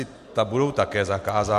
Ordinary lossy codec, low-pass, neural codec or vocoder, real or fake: MP3, 64 kbps; 14.4 kHz; none; real